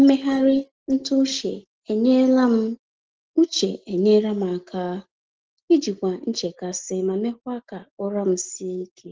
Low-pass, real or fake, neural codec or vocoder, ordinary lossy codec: 7.2 kHz; real; none; Opus, 16 kbps